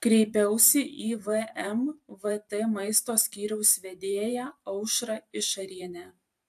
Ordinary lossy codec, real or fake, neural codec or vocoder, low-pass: AAC, 96 kbps; fake; vocoder, 44.1 kHz, 128 mel bands every 512 samples, BigVGAN v2; 14.4 kHz